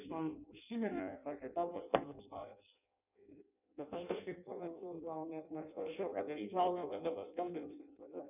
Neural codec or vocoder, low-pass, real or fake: codec, 16 kHz in and 24 kHz out, 0.6 kbps, FireRedTTS-2 codec; 3.6 kHz; fake